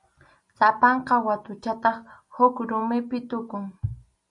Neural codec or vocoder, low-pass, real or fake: none; 10.8 kHz; real